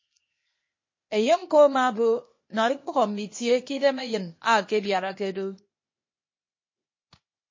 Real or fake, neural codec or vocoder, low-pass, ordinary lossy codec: fake; codec, 16 kHz, 0.8 kbps, ZipCodec; 7.2 kHz; MP3, 32 kbps